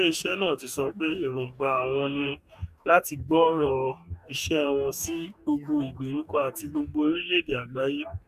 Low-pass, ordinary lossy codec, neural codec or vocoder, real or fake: 14.4 kHz; none; codec, 44.1 kHz, 2.6 kbps, DAC; fake